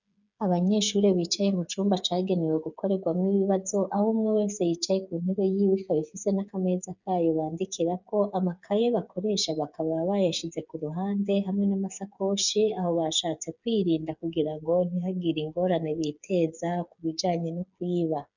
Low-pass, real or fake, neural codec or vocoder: 7.2 kHz; fake; codec, 16 kHz, 8 kbps, FreqCodec, smaller model